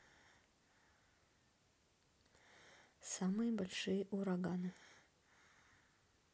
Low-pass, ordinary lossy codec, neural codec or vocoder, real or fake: none; none; none; real